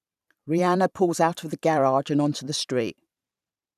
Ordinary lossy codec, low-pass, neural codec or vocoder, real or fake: none; 14.4 kHz; vocoder, 44.1 kHz, 128 mel bands every 512 samples, BigVGAN v2; fake